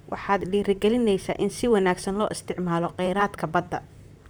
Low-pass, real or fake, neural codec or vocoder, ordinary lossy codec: none; fake; vocoder, 44.1 kHz, 128 mel bands, Pupu-Vocoder; none